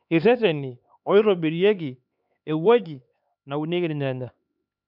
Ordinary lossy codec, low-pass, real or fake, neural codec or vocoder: none; 5.4 kHz; fake; codec, 16 kHz, 4 kbps, X-Codec, HuBERT features, trained on LibriSpeech